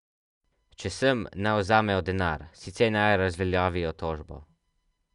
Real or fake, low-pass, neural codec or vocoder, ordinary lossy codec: real; 10.8 kHz; none; Opus, 64 kbps